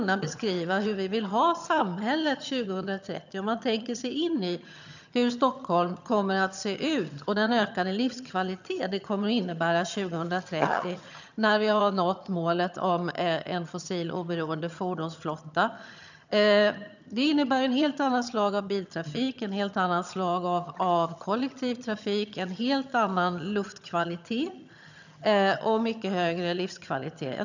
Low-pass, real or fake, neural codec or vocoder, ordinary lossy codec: 7.2 kHz; fake; vocoder, 22.05 kHz, 80 mel bands, HiFi-GAN; none